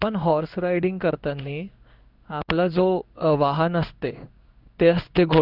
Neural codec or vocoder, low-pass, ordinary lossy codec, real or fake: codec, 16 kHz in and 24 kHz out, 1 kbps, XY-Tokenizer; 5.4 kHz; none; fake